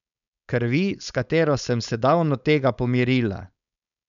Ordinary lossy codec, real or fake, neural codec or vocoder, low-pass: none; fake; codec, 16 kHz, 4.8 kbps, FACodec; 7.2 kHz